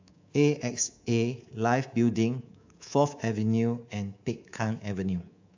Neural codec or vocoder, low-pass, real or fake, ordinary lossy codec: codec, 24 kHz, 3.1 kbps, DualCodec; 7.2 kHz; fake; AAC, 48 kbps